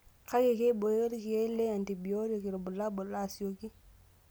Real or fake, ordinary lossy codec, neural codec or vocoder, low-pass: real; none; none; none